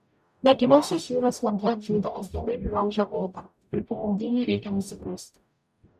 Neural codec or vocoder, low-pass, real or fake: codec, 44.1 kHz, 0.9 kbps, DAC; 14.4 kHz; fake